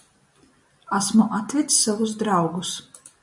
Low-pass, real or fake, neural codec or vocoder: 10.8 kHz; real; none